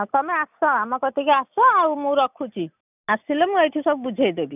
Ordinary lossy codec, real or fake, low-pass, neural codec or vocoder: none; real; 3.6 kHz; none